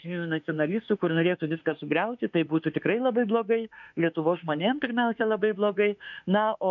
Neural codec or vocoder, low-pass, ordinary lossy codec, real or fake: autoencoder, 48 kHz, 32 numbers a frame, DAC-VAE, trained on Japanese speech; 7.2 kHz; MP3, 64 kbps; fake